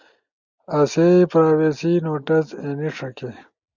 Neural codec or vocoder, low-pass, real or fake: none; 7.2 kHz; real